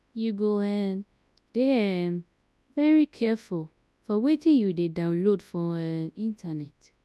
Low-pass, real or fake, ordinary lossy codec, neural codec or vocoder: none; fake; none; codec, 24 kHz, 0.9 kbps, WavTokenizer, large speech release